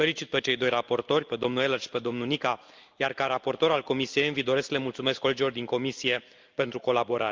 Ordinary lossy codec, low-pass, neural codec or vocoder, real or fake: Opus, 24 kbps; 7.2 kHz; none; real